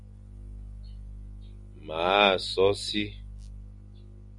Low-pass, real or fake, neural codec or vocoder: 10.8 kHz; real; none